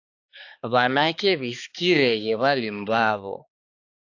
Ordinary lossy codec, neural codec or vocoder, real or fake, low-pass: AAC, 48 kbps; codec, 16 kHz, 2 kbps, X-Codec, HuBERT features, trained on balanced general audio; fake; 7.2 kHz